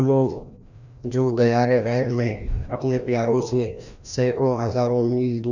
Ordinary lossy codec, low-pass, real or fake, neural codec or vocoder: none; 7.2 kHz; fake; codec, 16 kHz, 1 kbps, FreqCodec, larger model